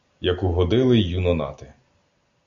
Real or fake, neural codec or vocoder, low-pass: real; none; 7.2 kHz